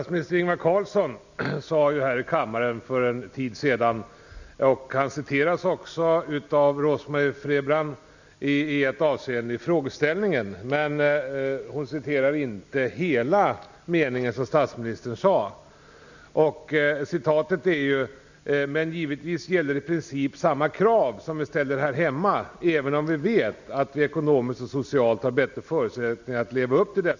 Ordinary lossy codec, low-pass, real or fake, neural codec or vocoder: none; 7.2 kHz; real; none